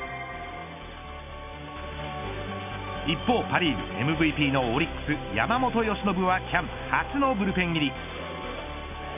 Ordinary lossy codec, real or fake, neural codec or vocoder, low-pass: none; real; none; 3.6 kHz